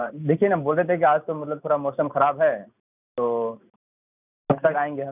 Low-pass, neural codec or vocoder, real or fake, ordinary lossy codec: 3.6 kHz; none; real; none